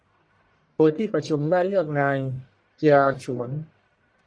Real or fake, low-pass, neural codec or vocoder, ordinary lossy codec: fake; 9.9 kHz; codec, 44.1 kHz, 1.7 kbps, Pupu-Codec; Opus, 64 kbps